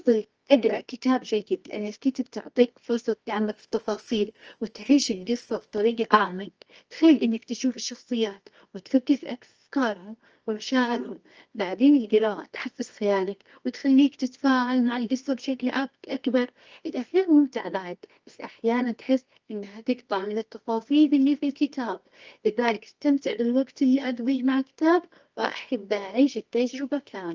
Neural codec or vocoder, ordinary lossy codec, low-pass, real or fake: codec, 24 kHz, 0.9 kbps, WavTokenizer, medium music audio release; Opus, 24 kbps; 7.2 kHz; fake